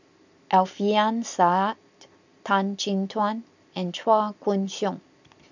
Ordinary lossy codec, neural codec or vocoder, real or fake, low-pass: none; none; real; 7.2 kHz